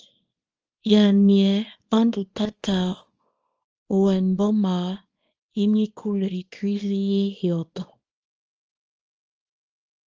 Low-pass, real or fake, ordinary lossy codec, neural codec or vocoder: 7.2 kHz; fake; Opus, 24 kbps; codec, 24 kHz, 0.9 kbps, WavTokenizer, small release